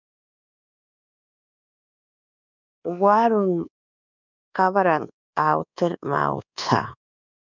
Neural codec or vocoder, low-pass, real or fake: codec, 24 kHz, 1.2 kbps, DualCodec; 7.2 kHz; fake